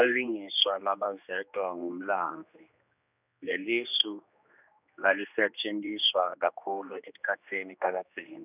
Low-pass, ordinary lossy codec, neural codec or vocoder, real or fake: 3.6 kHz; none; codec, 16 kHz, 2 kbps, X-Codec, HuBERT features, trained on general audio; fake